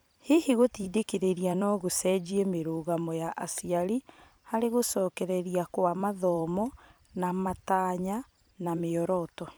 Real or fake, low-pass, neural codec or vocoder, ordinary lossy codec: fake; none; vocoder, 44.1 kHz, 128 mel bands every 256 samples, BigVGAN v2; none